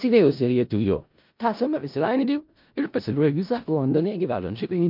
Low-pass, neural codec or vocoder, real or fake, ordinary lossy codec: 5.4 kHz; codec, 16 kHz in and 24 kHz out, 0.4 kbps, LongCat-Audio-Codec, four codebook decoder; fake; MP3, 48 kbps